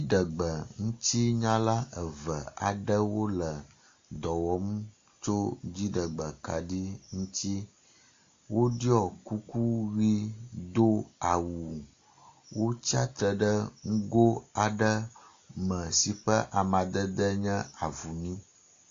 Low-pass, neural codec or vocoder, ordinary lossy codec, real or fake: 7.2 kHz; none; AAC, 48 kbps; real